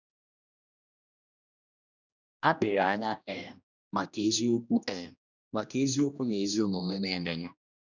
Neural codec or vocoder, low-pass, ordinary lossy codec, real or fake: codec, 16 kHz, 1 kbps, X-Codec, HuBERT features, trained on general audio; 7.2 kHz; none; fake